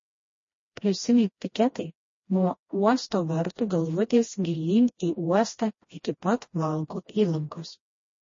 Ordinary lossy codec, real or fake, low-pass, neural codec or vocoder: MP3, 32 kbps; fake; 7.2 kHz; codec, 16 kHz, 1 kbps, FreqCodec, smaller model